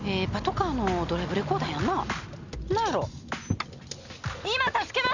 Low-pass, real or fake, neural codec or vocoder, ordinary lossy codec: 7.2 kHz; real; none; none